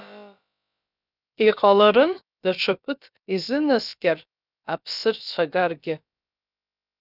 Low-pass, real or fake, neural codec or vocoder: 5.4 kHz; fake; codec, 16 kHz, about 1 kbps, DyCAST, with the encoder's durations